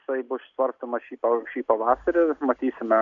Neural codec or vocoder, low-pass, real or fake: none; 7.2 kHz; real